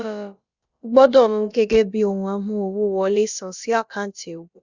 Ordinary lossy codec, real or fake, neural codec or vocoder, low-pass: Opus, 64 kbps; fake; codec, 16 kHz, about 1 kbps, DyCAST, with the encoder's durations; 7.2 kHz